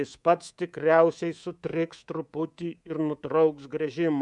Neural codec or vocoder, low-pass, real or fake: codec, 24 kHz, 3.1 kbps, DualCodec; 10.8 kHz; fake